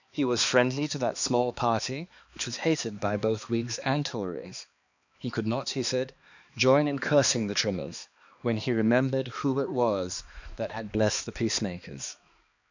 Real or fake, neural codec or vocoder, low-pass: fake; codec, 16 kHz, 2 kbps, X-Codec, HuBERT features, trained on balanced general audio; 7.2 kHz